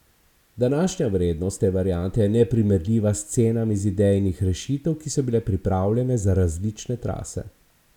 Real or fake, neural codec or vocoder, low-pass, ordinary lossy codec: real; none; 19.8 kHz; none